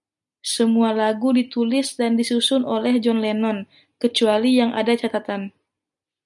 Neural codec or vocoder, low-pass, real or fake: none; 10.8 kHz; real